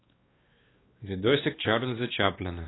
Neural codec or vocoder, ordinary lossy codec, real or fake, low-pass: codec, 16 kHz, 2 kbps, X-Codec, WavLM features, trained on Multilingual LibriSpeech; AAC, 16 kbps; fake; 7.2 kHz